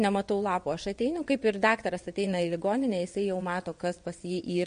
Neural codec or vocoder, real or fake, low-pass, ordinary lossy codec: vocoder, 22.05 kHz, 80 mel bands, WaveNeXt; fake; 9.9 kHz; MP3, 48 kbps